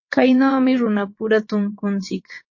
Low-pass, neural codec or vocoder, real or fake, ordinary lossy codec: 7.2 kHz; vocoder, 22.05 kHz, 80 mel bands, WaveNeXt; fake; MP3, 32 kbps